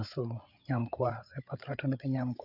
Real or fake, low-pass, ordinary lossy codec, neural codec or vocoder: fake; 5.4 kHz; none; codec, 16 kHz, 8 kbps, FreqCodec, larger model